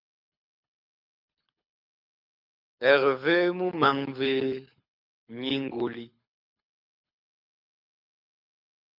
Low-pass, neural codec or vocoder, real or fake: 5.4 kHz; codec, 24 kHz, 6 kbps, HILCodec; fake